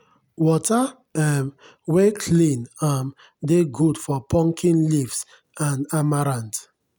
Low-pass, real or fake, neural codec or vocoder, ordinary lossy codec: none; real; none; none